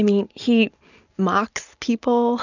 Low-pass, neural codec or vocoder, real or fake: 7.2 kHz; none; real